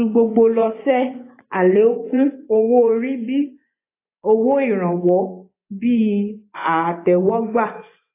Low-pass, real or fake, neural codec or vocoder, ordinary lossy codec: 3.6 kHz; fake; vocoder, 24 kHz, 100 mel bands, Vocos; AAC, 24 kbps